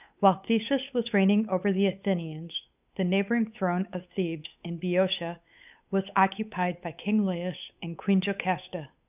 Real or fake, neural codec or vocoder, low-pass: fake; codec, 16 kHz, 8 kbps, FunCodec, trained on Chinese and English, 25 frames a second; 3.6 kHz